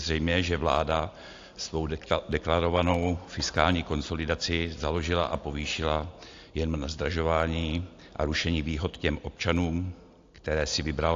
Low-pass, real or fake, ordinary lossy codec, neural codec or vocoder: 7.2 kHz; real; AAC, 48 kbps; none